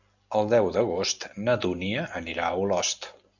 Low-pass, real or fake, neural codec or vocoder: 7.2 kHz; real; none